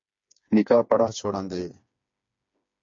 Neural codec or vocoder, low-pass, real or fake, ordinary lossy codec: codec, 16 kHz, 4 kbps, FreqCodec, smaller model; 7.2 kHz; fake; MP3, 48 kbps